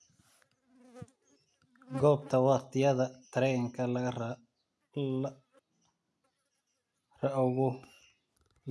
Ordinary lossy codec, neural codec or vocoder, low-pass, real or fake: none; none; none; real